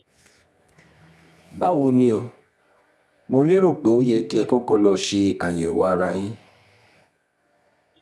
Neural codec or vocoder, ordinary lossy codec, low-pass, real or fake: codec, 24 kHz, 0.9 kbps, WavTokenizer, medium music audio release; none; none; fake